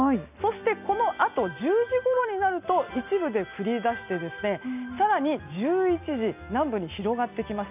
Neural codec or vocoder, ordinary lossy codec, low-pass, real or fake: none; none; 3.6 kHz; real